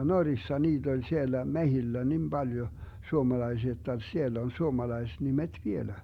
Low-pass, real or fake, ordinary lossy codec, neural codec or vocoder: 19.8 kHz; real; Opus, 64 kbps; none